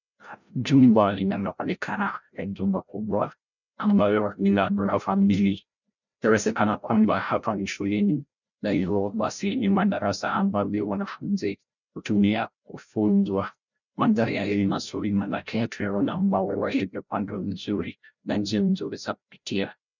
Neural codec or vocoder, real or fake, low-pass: codec, 16 kHz, 0.5 kbps, FreqCodec, larger model; fake; 7.2 kHz